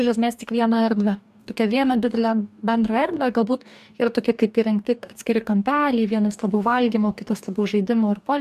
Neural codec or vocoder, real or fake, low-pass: codec, 44.1 kHz, 2.6 kbps, DAC; fake; 14.4 kHz